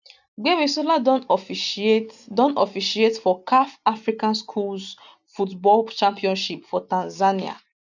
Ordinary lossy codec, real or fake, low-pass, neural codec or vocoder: none; real; 7.2 kHz; none